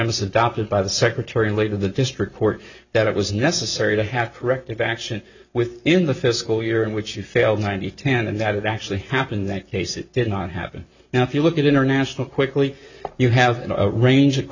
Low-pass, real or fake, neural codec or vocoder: 7.2 kHz; real; none